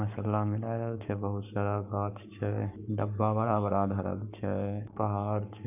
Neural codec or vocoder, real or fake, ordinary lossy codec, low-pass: codec, 44.1 kHz, 7.8 kbps, Pupu-Codec; fake; none; 3.6 kHz